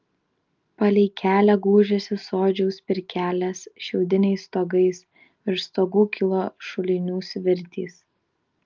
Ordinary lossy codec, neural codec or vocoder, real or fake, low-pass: Opus, 24 kbps; none; real; 7.2 kHz